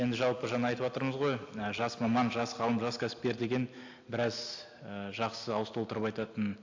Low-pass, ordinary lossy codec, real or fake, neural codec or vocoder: 7.2 kHz; AAC, 48 kbps; real; none